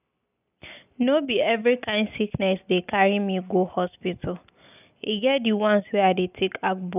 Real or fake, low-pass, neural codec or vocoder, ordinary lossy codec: real; 3.6 kHz; none; none